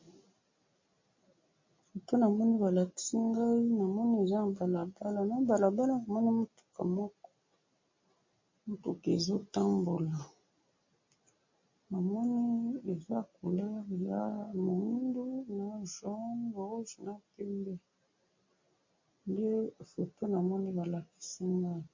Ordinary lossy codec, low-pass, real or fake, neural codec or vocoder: MP3, 32 kbps; 7.2 kHz; real; none